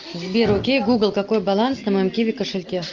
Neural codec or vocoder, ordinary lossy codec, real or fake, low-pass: none; Opus, 24 kbps; real; 7.2 kHz